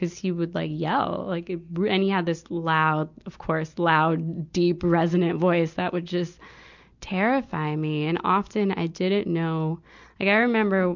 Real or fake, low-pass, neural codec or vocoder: real; 7.2 kHz; none